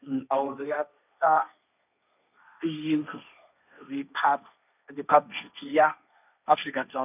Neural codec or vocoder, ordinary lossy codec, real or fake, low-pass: codec, 16 kHz, 1.1 kbps, Voila-Tokenizer; none; fake; 3.6 kHz